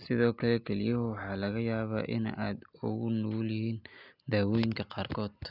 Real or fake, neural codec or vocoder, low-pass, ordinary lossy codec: real; none; 5.4 kHz; none